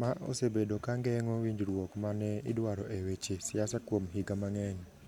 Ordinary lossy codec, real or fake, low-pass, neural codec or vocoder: none; real; 19.8 kHz; none